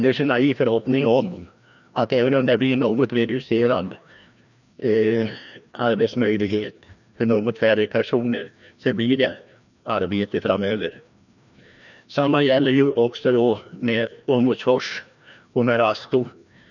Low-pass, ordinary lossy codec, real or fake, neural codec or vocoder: 7.2 kHz; none; fake; codec, 16 kHz, 1 kbps, FreqCodec, larger model